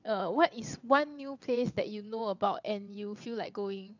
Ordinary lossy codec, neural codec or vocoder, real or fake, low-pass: none; vocoder, 22.05 kHz, 80 mel bands, WaveNeXt; fake; 7.2 kHz